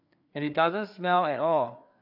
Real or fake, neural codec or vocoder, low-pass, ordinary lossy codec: fake; codec, 16 kHz, 4 kbps, FreqCodec, larger model; 5.4 kHz; AAC, 48 kbps